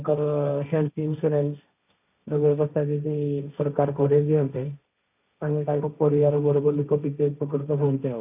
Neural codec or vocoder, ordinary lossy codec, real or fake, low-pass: codec, 16 kHz, 1.1 kbps, Voila-Tokenizer; none; fake; 3.6 kHz